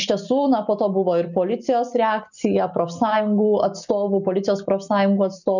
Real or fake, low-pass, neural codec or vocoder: real; 7.2 kHz; none